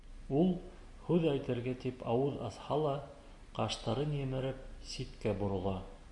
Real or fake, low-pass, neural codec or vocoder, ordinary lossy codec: real; 10.8 kHz; none; AAC, 64 kbps